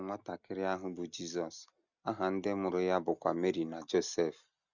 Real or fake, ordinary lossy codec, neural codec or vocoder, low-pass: real; none; none; 7.2 kHz